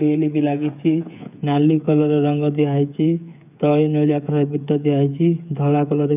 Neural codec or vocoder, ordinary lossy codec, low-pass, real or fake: codec, 16 kHz, 8 kbps, FreqCodec, smaller model; none; 3.6 kHz; fake